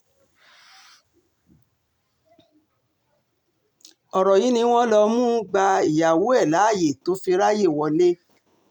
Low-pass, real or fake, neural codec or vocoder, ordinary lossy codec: 19.8 kHz; real; none; none